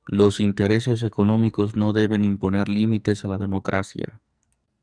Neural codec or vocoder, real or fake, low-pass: codec, 44.1 kHz, 2.6 kbps, SNAC; fake; 9.9 kHz